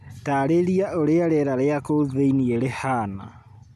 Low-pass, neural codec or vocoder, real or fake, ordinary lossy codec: 14.4 kHz; none; real; none